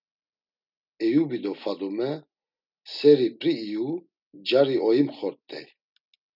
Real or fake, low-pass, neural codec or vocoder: real; 5.4 kHz; none